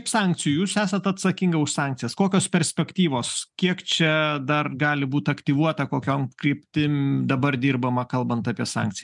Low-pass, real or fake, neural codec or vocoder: 10.8 kHz; real; none